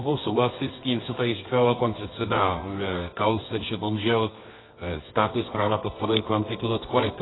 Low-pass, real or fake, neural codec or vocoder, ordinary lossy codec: 7.2 kHz; fake; codec, 24 kHz, 0.9 kbps, WavTokenizer, medium music audio release; AAC, 16 kbps